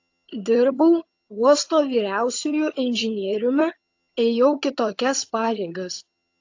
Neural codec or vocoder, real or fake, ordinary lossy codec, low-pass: vocoder, 22.05 kHz, 80 mel bands, HiFi-GAN; fake; AAC, 48 kbps; 7.2 kHz